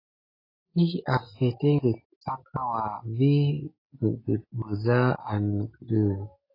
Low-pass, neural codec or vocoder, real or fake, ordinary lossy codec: 5.4 kHz; none; real; AAC, 24 kbps